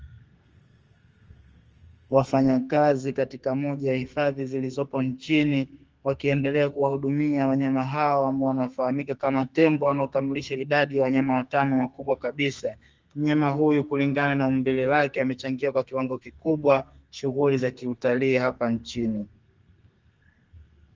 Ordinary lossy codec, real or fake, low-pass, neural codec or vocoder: Opus, 24 kbps; fake; 7.2 kHz; codec, 32 kHz, 1.9 kbps, SNAC